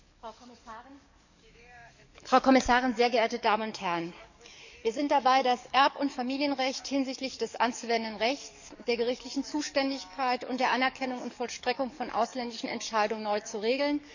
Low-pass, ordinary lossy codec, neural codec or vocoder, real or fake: 7.2 kHz; none; codec, 44.1 kHz, 7.8 kbps, DAC; fake